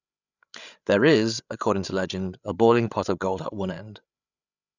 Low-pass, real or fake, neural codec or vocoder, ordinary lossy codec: 7.2 kHz; fake; codec, 16 kHz, 8 kbps, FreqCodec, larger model; none